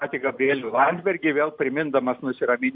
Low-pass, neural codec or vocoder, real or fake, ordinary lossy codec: 5.4 kHz; none; real; MP3, 48 kbps